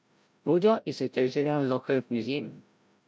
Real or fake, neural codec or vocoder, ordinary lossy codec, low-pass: fake; codec, 16 kHz, 0.5 kbps, FreqCodec, larger model; none; none